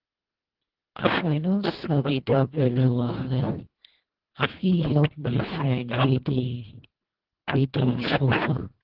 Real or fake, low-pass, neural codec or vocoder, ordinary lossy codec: fake; 5.4 kHz; codec, 24 kHz, 1.5 kbps, HILCodec; Opus, 16 kbps